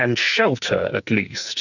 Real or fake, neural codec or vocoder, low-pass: fake; codec, 44.1 kHz, 2.6 kbps, SNAC; 7.2 kHz